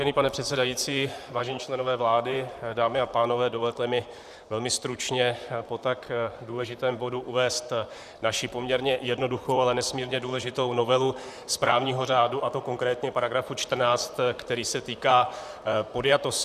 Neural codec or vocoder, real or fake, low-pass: vocoder, 44.1 kHz, 128 mel bands, Pupu-Vocoder; fake; 14.4 kHz